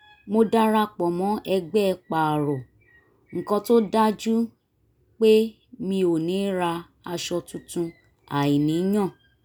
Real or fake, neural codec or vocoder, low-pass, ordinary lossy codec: real; none; none; none